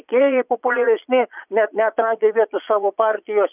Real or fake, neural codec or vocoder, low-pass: fake; vocoder, 22.05 kHz, 80 mel bands, Vocos; 3.6 kHz